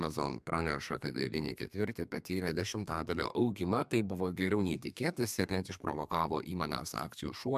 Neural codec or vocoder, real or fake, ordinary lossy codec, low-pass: codec, 32 kHz, 1.9 kbps, SNAC; fake; MP3, 96 kbps; 14.4 kHz